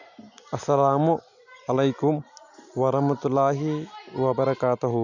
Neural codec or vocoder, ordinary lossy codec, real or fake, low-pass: none; none; real; 7.2 kHz